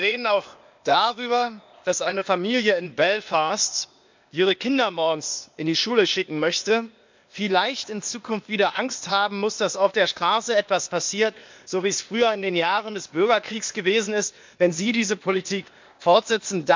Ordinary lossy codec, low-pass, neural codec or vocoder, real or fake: MP3, 64 kbps; 7.2 kHz; codec, 16 kHz, 0.8 kbps, ZipCodec; fake